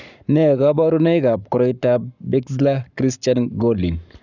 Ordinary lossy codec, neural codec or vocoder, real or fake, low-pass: none; codec, 44.1 kHz, 7.8 kbps, Pupu-Codec; fake; 7.2 kHz